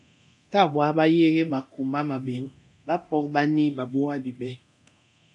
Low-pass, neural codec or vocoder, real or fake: 10.8 kHz; codec, 24 kHz, 0.9 kbps, DualCodec; fake